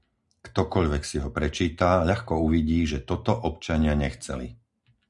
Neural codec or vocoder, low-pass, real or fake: none; 10.8 kHz; real